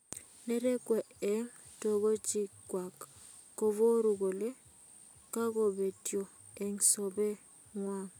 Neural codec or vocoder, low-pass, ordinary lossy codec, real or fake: none; none; none; real